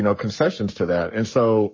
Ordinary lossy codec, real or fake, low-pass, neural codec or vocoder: MP3, 32 kbps; fake; 7.2 kHz; codec, 44.1 kHz, 7.8 kbps, Pupu-Codec